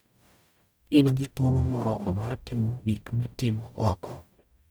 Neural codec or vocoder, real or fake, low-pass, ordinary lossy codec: codec, 44.1 kHz, 0.9 kbps, DAC; fake; none; none